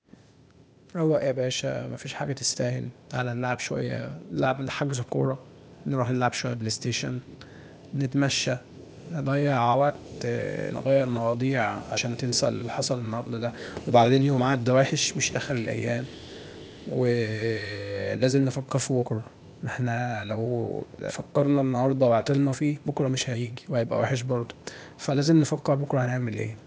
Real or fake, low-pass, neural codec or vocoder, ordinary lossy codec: fake; none; codec, 16 kHz, 0.8 kbps, ZipCodec; none